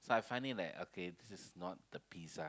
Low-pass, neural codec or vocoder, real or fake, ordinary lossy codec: none; none; real; none